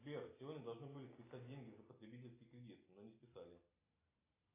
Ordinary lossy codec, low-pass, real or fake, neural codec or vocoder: AAC, 24 kbps; 3.6 kHz; real; none